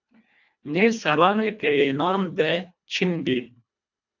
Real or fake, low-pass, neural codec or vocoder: fake; 7.2 kHz; codec, 24 kHz, 1.5 kbps, HILCodec